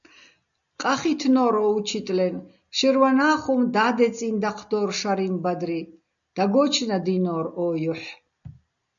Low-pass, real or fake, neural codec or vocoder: 7.2 kHz; real; none